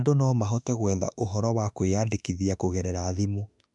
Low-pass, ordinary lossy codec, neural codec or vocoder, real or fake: 10.8 kHz; none; autoencoder, 48 kHz, 32 numbers a frame, DAC-VAE, trained on Japanese speech; fake